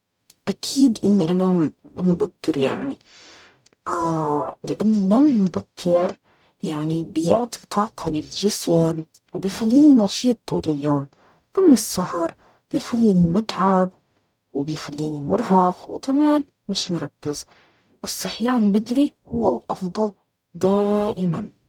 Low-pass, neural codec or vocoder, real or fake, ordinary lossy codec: 19.8 kHz; codec, 44.1 kHz, 0.9 kbps, DAC; fake; none